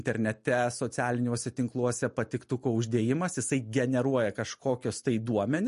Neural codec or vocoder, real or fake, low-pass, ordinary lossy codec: none; real; 14.4 kHz; MP3, 48 kbps